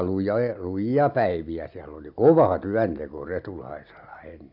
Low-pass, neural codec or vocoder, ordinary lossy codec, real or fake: 5.4 kHz; none; AAC, 48 kbps; real